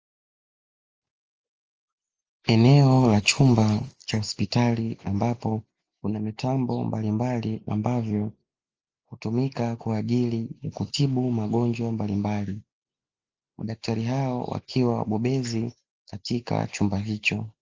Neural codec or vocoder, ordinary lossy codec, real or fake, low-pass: none; Opus, 24 kbps; real; 7.2 kHz